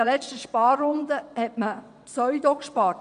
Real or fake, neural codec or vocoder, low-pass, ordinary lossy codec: fake; vocoder, 22.05 kHz, 80 mel bands, WaveNeXt; 9.9 kHz; none